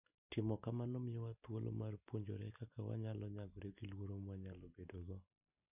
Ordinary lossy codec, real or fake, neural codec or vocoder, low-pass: none; real; none; 3.6 kHz